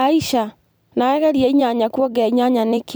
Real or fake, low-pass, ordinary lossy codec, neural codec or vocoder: fake; none; none; vocoder, 44.1 kHz, 128 mel bands every 256 samples, BigVGAN v2